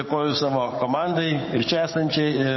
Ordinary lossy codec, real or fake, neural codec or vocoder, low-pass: MP3, 24 kbps; fake; codec, 44.1 kHz, 7.8 kbps, DAC; 7.2 kHz